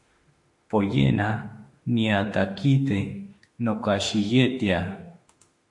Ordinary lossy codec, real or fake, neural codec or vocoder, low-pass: MP3, 48 kbps; fake; autoencoder, 48 kHz, 32 numbers a frame, DAC-VAE, trained on Japanese speech; 10.8 kHz